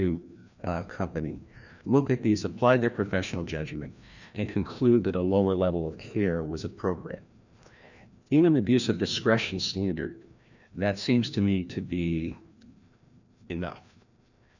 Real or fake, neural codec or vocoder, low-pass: fake; codec, 16 kHz, 1 kbps, FreqCodec, larger model; 7.2 kHz